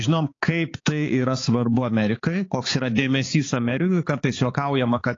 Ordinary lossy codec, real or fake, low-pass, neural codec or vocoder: AAC, 32 kbps; fake; 7.2 kHz; codec, 16 kHz, 4 kbps, X-Codec, HuBERT features, trained on balanced general audio